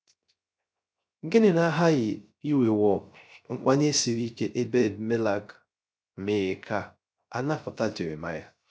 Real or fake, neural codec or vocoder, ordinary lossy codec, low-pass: fake; codec, 16 kHz, 0.3 kbps, FocalCodec; none; none